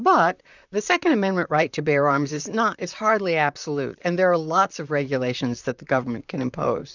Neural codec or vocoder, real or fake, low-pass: vocoder, 44.1 kHz, 128 mel bands, Pupu-Vocoder; fake; 7.2 kHz